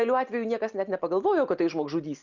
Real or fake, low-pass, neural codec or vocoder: real; 7.2 kHz; none